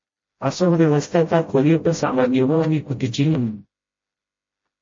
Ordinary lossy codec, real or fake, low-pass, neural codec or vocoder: MP3, 32 kbps; fake; 7.2 kHz; codec, 16 kHz, 0.5 kbps, FreqCodec, smaller model